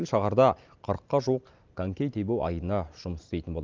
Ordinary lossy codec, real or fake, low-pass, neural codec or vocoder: Opus, 32 kbps; real; 7.2 kHz; none